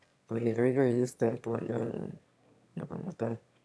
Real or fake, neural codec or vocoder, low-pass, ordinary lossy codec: fake; autoencoder, 22.05 kHz, a latent of 192 numbers a frame, VITS, trained on one speaker; none; none